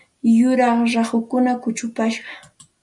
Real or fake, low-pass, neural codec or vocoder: real; 10.8 kHz; none